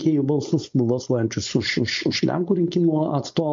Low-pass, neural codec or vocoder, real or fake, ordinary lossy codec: 7.2 kHz; codec, 16 kHz, 4.8 kbps, FACodec; fake; MP3, 48 kbps